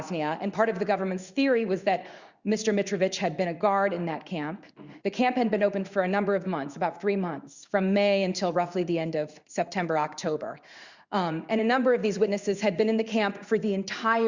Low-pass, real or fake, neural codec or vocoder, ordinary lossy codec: 7.2 kHz; fake; codec, 16 kHz in and 24 kHz out, 1 kbps, XY-Tokenizer; Opus, 64 kbps